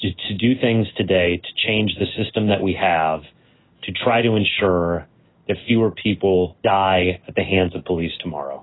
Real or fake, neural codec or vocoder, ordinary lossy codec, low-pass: real; none; AAC, 16 kbps; 7.2 kHz